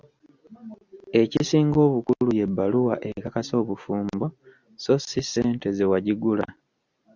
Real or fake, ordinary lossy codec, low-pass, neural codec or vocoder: real; Opus, 64 kbps; 7.2 kHz; none